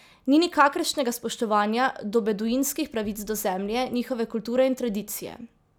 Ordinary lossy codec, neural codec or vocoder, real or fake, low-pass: none; none; real; none